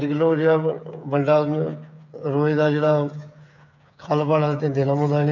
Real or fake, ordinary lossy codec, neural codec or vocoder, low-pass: fake; none; codec, 16 kHz, 8 kbps, FreqCodec, smaller model; 7.2 kHz